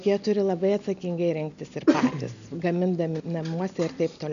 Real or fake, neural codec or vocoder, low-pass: real; none; 7.2 kHz